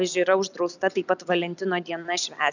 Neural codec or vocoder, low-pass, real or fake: none; 7.2 kHz; real